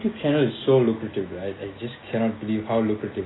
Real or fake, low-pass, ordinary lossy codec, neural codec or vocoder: real; 7.2 kHz; AAC, 16 kbps; none